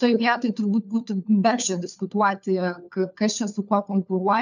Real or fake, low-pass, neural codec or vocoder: fake; 7.2 kHz; codec, 16 kHz, 4 kbps, FunCodec, trained on LibriTTS, 50 frames a second